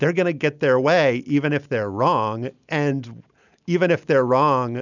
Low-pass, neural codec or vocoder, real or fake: 7.2 kHz; none; real